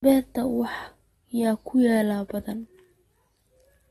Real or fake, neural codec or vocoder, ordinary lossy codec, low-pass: real; none; AAC, 32 kbps; 19.8 kHz